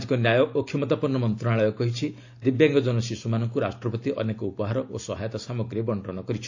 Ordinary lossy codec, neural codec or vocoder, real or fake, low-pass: AAC, 48 kbps; none; real; 7.2 kHz